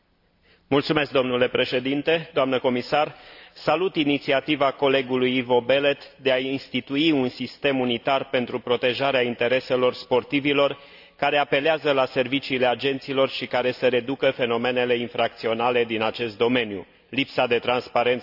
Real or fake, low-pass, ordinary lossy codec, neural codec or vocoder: real; 5.4 kHz; AAC, 48 kbps; none